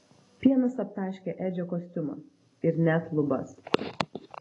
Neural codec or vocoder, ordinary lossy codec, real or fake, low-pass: none; AAC, 32 kbps; real; 10.8 kHz